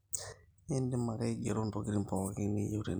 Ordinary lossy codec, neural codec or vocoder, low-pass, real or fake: none; none; none; real